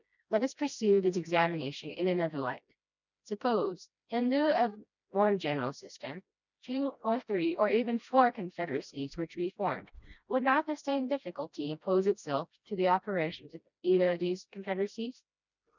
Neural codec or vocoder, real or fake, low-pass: codec, 16 kHz, 1 kbps, FreqCodec, smaller model; fake; 7.2 kHz